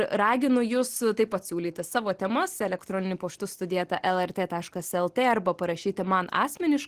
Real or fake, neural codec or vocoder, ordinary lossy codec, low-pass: real; none; Opus, 16 kbps; 14.4 kHz